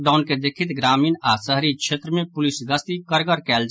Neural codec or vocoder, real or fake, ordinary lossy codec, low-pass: none; real; none; none